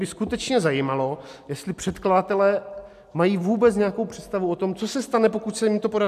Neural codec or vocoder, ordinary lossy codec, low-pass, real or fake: none; AAC, 96 kbps; 14.4 kHz; real